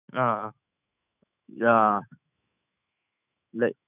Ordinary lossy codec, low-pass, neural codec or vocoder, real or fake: none; 3.6 kHz; autoencoder, 48 kHz, 128 numbers a frame, DAC-VAE, trained on Japanese speech; fake